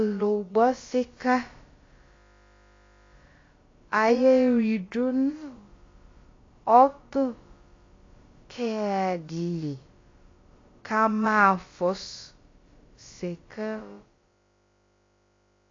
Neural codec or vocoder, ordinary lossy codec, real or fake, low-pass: codec, 16 kHz, about 1 kbps, DyCAST, with the encoder's durations; AAC, 32 kbps; fake; 7.2 kHz